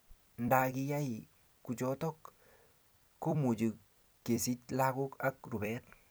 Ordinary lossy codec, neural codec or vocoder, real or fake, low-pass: none; vocoder, 44.1 kHz, 128 mel bands every 256 samples, BigVGAN v2; fake; none